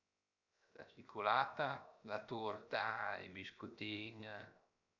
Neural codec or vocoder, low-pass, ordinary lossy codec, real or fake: codec, 16 kHz, 0.7 kbps, FocalCodec; 7.2 kHz; none; fake